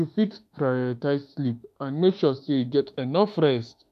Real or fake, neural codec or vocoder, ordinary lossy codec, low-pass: fake; autoencoder, 48 kHz, 32 numbers a frame, DAC-VAE, trained on Japanese speech; none; 14.4 kHz